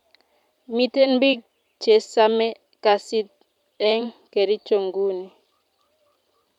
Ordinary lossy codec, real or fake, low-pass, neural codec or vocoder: none; fake; 19.8 kHz; vocoder, 44.1 kHz, 128 mel bands every 512 samples, BigVGAN v2